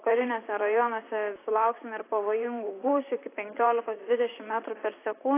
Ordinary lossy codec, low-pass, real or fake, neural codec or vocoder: AAC, 24 kbps; 3.6 kHz; fake; vocoder, 44.1 kHz, 128 mel bands, Pupu-Vocoder